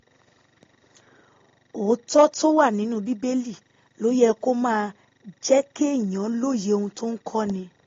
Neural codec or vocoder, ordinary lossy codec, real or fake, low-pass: none; AAC, 32 kbps; real; 7.2 kHz